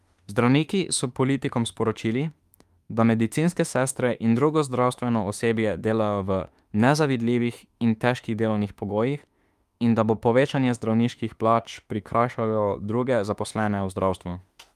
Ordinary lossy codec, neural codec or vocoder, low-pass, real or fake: Opus, 32 kbps; autoencoder, 48 kHz, 32 numbers a frame, DAC-VAE, trained on Japanese speech; 14.4 kHz; fake